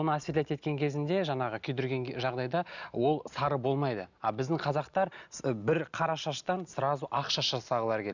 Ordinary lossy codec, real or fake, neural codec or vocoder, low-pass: none; real; none; 7.2 kHz